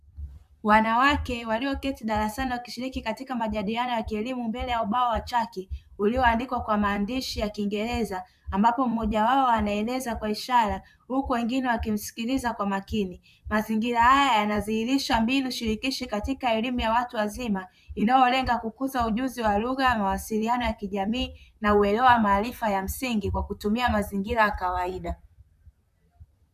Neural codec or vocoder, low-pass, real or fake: vocoder, 44.1 kHz, 128 mel bands, Pupu-Vocoder; 14.4 kHz; fake